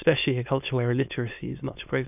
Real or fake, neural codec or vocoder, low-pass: fake; autoencoder, 22.05 kHz, a latent of 192 numbers a frame, VITS, trained on many speakers; 3.6 kHz